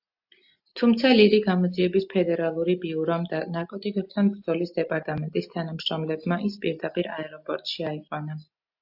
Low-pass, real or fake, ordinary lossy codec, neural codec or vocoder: 5.4 kHz; real; AAC, 48 kbps; none